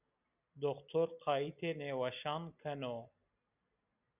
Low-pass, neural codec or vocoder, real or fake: 3.6 kHz; none; real